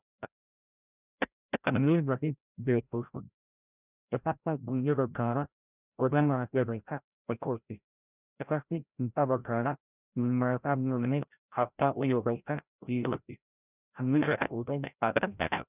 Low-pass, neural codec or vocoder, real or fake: 3.6 kHz; codec, 16 kHz, 0.5 kbps, FreqCodec, larger model; fake